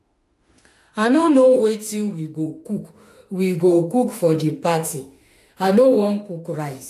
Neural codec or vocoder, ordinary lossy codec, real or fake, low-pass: autoencoder, 48 kHz, 32 numbers a frame, DAC-VAE, trained on Japanese speech; AAC, 64 kbps; fake; 14.4 kHz